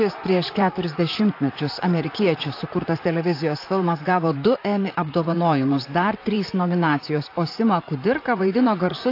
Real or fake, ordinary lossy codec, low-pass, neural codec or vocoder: fake; AAC, 32 kbps; 5.4 kHz; vocoder, 44.1 kHz, 128 mel bands, Pupu-Vocoder